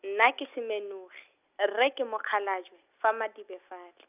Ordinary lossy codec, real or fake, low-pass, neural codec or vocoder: none; real; 3.6 kHz; none